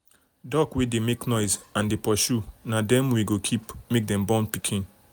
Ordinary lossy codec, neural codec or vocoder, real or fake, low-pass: none; none; real; none